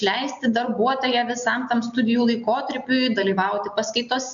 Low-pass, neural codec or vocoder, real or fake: 7.2 kHz; none; real